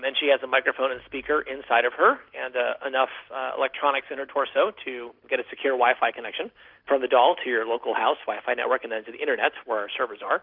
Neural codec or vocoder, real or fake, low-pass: none; real; 5.4 kHz